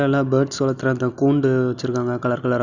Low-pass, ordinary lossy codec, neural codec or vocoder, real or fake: 7.2 kHz; none; none; real